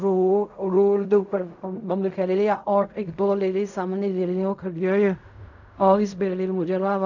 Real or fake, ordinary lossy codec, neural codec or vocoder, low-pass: fake; none; codec, 16 kHz in and 24 kHz out, 0.4 kbps, LongCat-Audio-Codec, fine tuned four codebook decoder; 7.2 kHz